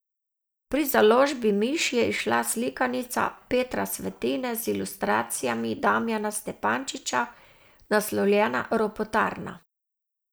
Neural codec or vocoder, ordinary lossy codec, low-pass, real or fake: none; none; none; real